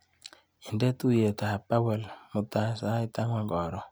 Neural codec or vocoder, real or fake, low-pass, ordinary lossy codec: none; real; none; none